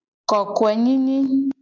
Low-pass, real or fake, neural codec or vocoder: 7.2 kHz; real; none